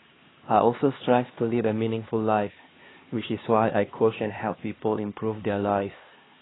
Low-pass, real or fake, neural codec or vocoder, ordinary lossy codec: 7.2 kHz; fake; codec, 16 kHz, 2 kbps, X-Codec, HuBERT features, trained on LibriSpeech; AAC, 16 kbps